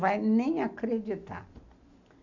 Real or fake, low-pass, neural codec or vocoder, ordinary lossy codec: real; 7.2 kHz; none; none